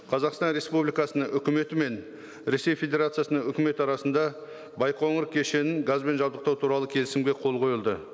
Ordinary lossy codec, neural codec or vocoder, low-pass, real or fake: none; none; none; real